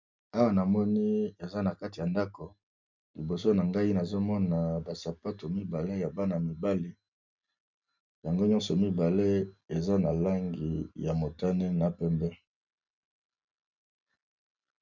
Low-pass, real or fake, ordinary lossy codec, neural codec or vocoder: 7.2 kHz; real; MP3, 64 kbps; none